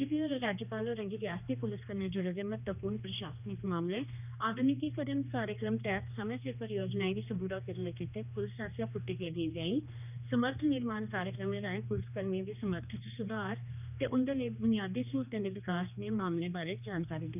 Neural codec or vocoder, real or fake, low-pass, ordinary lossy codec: codec, 16 kHz, 2 kbps, X-Codec, HuBERT features, trained on general audio; fake; 3.6 kHz; none